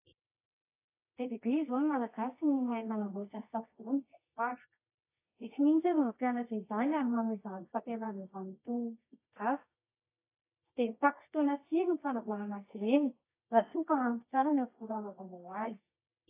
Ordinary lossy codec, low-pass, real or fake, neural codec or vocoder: AAC, 24 kbps; 3.6 kHz; fake; codec, 24 kHz, 0.9 kbps, WavTokenizer, medium music audio release